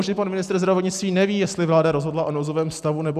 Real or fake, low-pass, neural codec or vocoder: real; 14.4 kHz; none